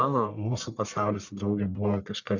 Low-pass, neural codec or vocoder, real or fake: 7.2 kHz; codec, 44.1 kHz, 1.7 kbps, Pupu-Codec; fake